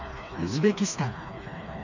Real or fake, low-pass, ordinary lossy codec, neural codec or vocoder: fake; 7.2 kHz; none; codec, 16 kHz, 2 kbps, FreqCodec, smaller model